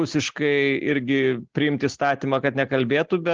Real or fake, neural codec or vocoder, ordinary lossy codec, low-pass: real; none; Opus, 16 kbps; 7.2 kHz